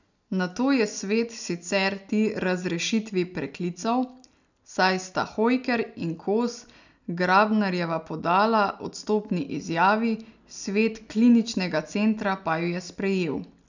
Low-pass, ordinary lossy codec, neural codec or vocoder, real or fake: 7.2 kHz; none; none; real